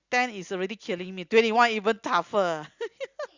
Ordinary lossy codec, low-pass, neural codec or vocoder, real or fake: Opus, 64 kbps; 7.2 kHz; none; real